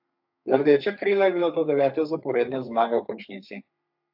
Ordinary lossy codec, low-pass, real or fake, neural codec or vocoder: AAC, 48 kbps; 5.4 kHz; fake; codec, 32 kHz, 1.9 kbps, SNAC